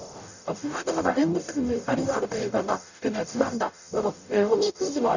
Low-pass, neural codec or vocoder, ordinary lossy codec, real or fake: 7.2 kHz; codec, 44.1 kHz, 0.9 kbps, DAC; none; fake